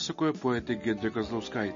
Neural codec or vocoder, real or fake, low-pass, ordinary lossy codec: none; real; 7.2 kHz; MP3, 32 kbps